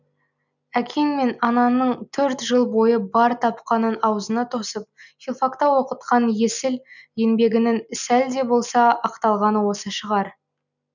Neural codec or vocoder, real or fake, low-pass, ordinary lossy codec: none; real; 7.2 kHz; none